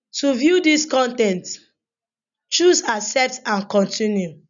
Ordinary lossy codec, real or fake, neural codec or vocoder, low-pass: none; real; none; 7.2 kHz